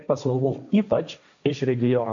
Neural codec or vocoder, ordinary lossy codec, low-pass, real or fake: codec, 16 kHz, 1.1 kbps, Voila-Tokenizer; AAC, 64 kbps; 7.2 kHz; fake